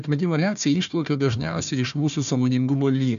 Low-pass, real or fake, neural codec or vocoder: 7.2 kHz; fake; codec, 16 kHz, 1 kbps, FunCodec, trained on Chinese and English, 50 frames a second